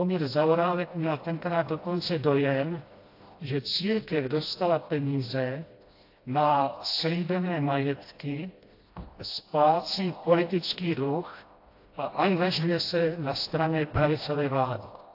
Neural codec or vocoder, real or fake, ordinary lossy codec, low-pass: codec, 16 kHz, 1 kbps, FreqCodec, smaller model; fake; AAC, 32 kbps; 5.4 kHz